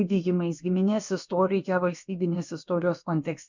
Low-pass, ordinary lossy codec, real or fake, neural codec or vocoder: 7.2 kHz; MP3, 64 kbps; fake; codec, 16 kHz, about 1 kbps, DyCAST, with the encoder's durations